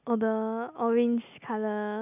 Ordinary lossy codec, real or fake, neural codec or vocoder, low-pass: none; real; none; 3.6 kHz